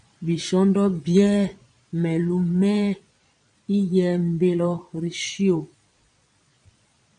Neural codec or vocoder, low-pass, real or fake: vocoder, 22.05 kHz, 80 mel bands, Vocos; 9.9 kHz; fake